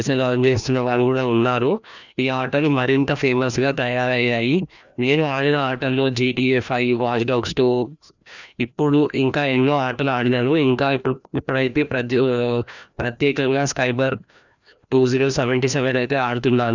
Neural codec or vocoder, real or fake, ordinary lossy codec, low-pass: codec, 16 kHz, 1 kbps, FreqCodec, larger model; fake; none; 7.2 kHz